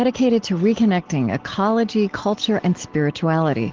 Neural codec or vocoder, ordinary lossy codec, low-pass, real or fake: none; Opus, 16 kbps; 7.2 kHz; real